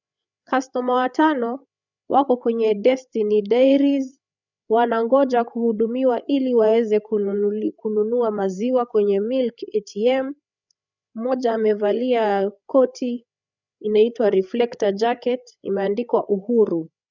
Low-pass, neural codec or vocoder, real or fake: 7.2 kHz; codec, 16 kHz, 8 kbps, FreqCodec, larger model; fake